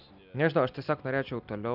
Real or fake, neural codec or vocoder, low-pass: real; none; 5.4 kHz